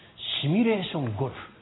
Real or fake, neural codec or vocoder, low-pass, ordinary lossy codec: real; none; 7.2 kHz; AAC, 16 kbps